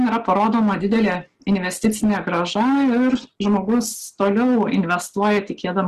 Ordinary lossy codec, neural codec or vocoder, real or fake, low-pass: Opus, 16 kbps; none; real; 14.4 kHz